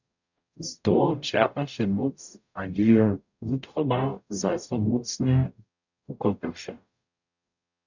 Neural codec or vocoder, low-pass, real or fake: codec, 44.1 kHz, 0.9 kbps, DAC; 7.2 kHz; fake